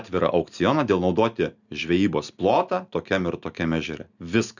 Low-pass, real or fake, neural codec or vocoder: 7.2 kHz; real; none